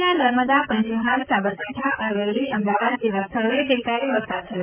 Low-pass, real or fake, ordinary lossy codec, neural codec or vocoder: 3.6 kHz; fake; none; vocoder, 44.1 kHz, 128 mel bands, Pupu-Vocoder